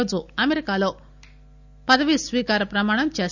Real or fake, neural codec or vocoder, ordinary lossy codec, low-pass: real; none; none; 7.2 kHz